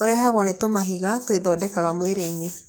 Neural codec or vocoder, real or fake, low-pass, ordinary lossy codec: codec, 44.1 kHz, 2.6 kbps, SNAC; fake; none; none